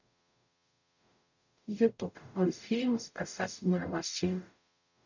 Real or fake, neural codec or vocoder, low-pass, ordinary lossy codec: fake; codec, 44.1 kHz, 0.9 kbps, DAC; 7.2 kHz; none